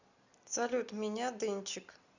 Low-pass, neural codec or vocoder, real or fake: 7.2 kHz; none; real